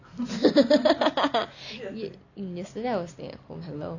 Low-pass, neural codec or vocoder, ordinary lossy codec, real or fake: 7.2 kHz; none; none; real